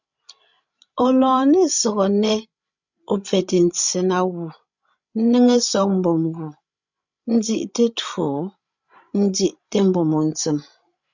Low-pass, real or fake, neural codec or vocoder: 7.2 kHz; fake; codec, 16 kHz, 16 kbps, FreqCodec, larger model